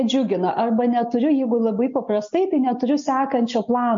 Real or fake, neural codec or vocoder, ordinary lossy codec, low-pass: real; none; MP3, 48 kbps; 7.2 kHz